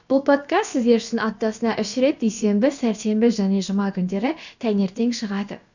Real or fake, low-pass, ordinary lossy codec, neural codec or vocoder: fake; 7.2 kHz; none; codec, 16 kHz, about 1 kbps, DyCAST, with the encoder's durations